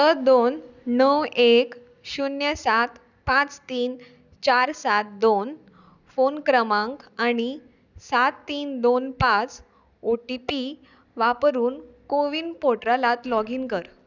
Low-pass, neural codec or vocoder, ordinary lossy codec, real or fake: 7.2 kHz; none; none; real